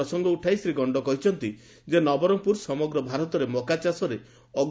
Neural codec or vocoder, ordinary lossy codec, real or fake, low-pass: none; none; real; none